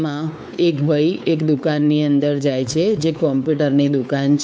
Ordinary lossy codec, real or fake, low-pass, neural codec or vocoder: none; fake; none; codec, 16 kHz, 4 kbps, X-Codec, WavLM features, trained on Multilingual LibriSpeech